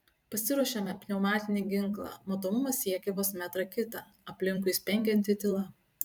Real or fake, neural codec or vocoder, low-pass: fake; vocoder, 44.1 kHz, 128 mel bands every 512 samples, BigVGAN v2; 19.8 kHz